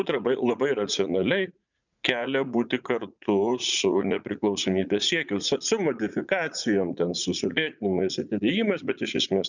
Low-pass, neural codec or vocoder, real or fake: 7.2 kHz; vocoder, 44.1 kHz, 80 mel bands, Vocos; fake